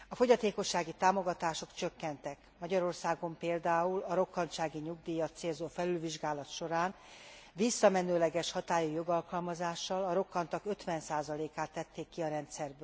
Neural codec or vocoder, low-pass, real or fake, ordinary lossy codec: none; none; real; none